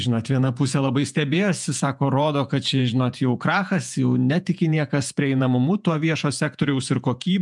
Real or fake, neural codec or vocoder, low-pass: fake; vocoder, 48 kHz, 128 mel bands, Vocos; 10.8 kHz